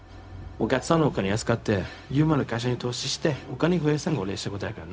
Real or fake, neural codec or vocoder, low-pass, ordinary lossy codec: fake; codec, 16 kHz, 0.4 kbps, LongCat-Audio-Codec; none; none